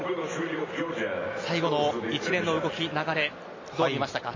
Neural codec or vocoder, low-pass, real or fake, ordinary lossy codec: none; 7.2 kHz; real; MP3, 32 kbps